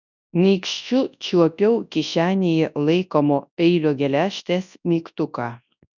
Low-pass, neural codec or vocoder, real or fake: 7.2 kHz; codec, 24 kHz, 0.9 kbps, WavTokenizer, large speech release; fake